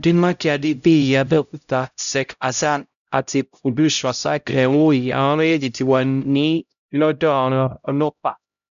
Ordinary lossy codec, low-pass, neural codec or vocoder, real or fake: none; 7.2 kHz; codec, 16 kHz, 0.5 kbps, X-Codec, HuBERT features, trained on LibriSpeech; fake